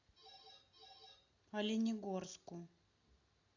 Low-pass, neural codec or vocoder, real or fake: 7.2 kHz; none; real